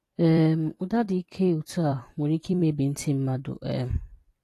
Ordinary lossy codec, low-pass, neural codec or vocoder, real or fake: AAC, 48 kbps; 14.4 kHz; vocoder, 44.1 kHz, 128 mel bands every 256 samples, BigVGAN v2; fake